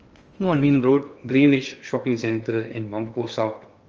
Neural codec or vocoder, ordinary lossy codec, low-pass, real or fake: codec, 16 kHz in and 24 kHz out, 0.8 kbps, FocalCodec, streaming, 65536 codes; Opus, 24 kbps; 7.2 kHz; fake